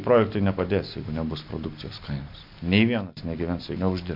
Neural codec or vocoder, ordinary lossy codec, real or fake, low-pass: none; MP3, 48 kbps; real; 5.4 kHz